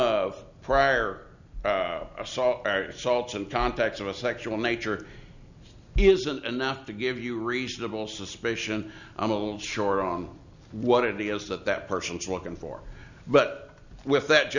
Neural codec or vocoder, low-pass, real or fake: none; 7.2 kHz; real